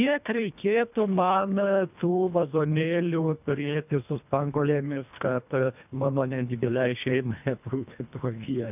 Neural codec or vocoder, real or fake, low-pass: codec, 24 kHz, 1.5 kbps, HILCodec; fake; 3.6 kHz